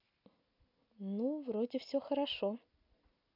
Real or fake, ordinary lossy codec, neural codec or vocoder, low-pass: real; none; none; 5.4 kHz